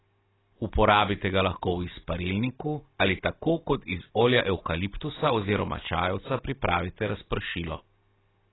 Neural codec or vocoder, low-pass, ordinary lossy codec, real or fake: none; 7.2 kHz; AAC, 16 kbps; real